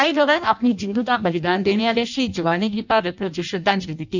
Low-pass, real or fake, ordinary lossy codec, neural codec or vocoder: 7.2 kHz; fake; none; codec, 16 kHz in and 24 kHz out, 0.6 kbps, FireRedTTS-2 codec